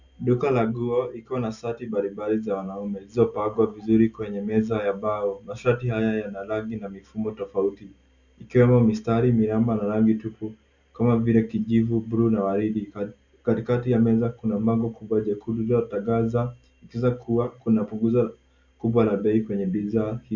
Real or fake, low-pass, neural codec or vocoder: real; 7.2 kHz; none